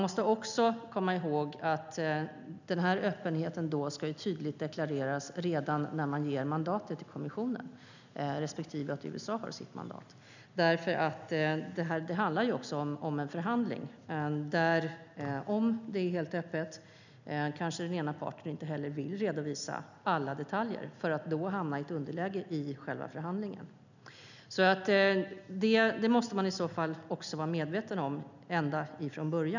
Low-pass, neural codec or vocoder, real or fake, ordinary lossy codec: 7.2 kHz; none; real; none